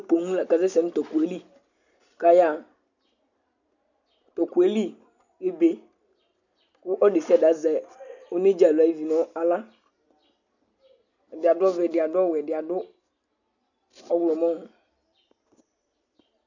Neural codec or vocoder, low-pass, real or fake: none; 7.2 kHz; real